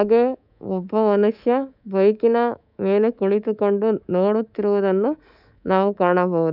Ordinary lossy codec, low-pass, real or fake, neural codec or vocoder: none; 5.4 kHz; fake; codec, 24 kHz, 3.1 kbps, DualCodec